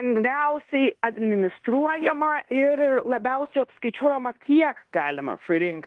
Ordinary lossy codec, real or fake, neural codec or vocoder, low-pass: Opus, 24 kbps; fake; codec, 16 kHz in and 24 kHz out, 0.9 kbps, LongCat-Audio-Codec, fine tuned four codebook decoder; 10.8 kHz